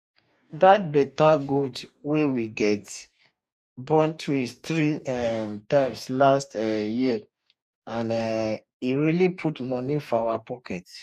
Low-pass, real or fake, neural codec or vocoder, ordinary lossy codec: 14.4 kHz; fake; codec, 44.1 kHz, 2.6 kbps, DAC; none